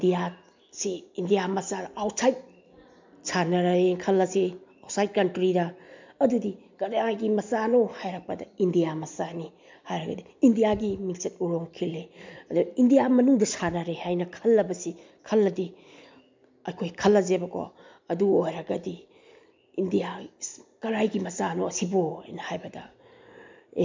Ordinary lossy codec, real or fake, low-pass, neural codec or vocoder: MP3, 64 kbps; real; 7.2 kHz; none